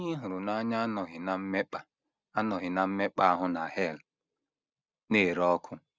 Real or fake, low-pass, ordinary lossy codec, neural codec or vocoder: real; none; none; none